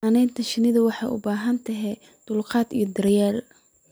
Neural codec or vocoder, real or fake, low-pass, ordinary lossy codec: none; real; none; none